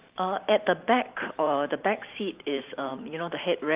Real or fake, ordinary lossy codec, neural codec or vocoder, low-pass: fake; Opus, 24 kbps; vocoder, 44.1 kHz, 128 mel bands every 512 samples, BigVGAN v2; 3.6 kHz